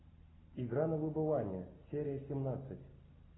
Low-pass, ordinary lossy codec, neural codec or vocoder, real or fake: 7.2 kHz; AAC, 16 kbps; none; real